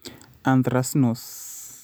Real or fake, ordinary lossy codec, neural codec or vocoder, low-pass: real; none; none; none